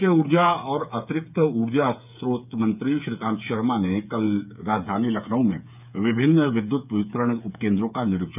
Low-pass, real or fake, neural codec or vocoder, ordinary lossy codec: 3.6 kHz; fake; codec, 16 kHz, 8 kbps, FreqCodec, smaller model; none